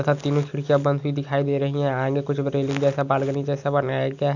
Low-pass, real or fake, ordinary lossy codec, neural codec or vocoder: 7.2 kHz; real; none; none